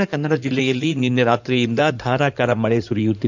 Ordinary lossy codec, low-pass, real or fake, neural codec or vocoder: none; 7.2 kHz; fake; codec, 16 kHz in and 24 kHz out, 2.2 kbps, FireRedTTS-2 codec